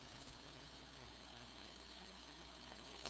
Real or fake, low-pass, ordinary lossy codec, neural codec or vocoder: fake; none; none; codec, 16 kHz, 2 kbps, FunCodec, trained on LibriTTS, 25 frames a second